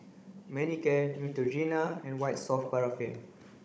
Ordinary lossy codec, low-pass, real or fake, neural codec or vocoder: none; none; fake; codec, 16 kHz, 16 kbps, FunCodec, trained on Chinese and English, 50 frames a second